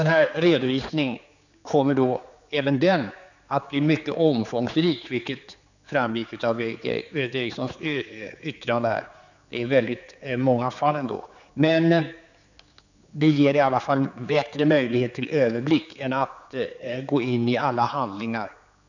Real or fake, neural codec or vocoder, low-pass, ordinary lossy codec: fake; codec, 16 kHz, 4 kbps, X-Codec, HuBERT features, trained on general audio; 7.2 kHz; none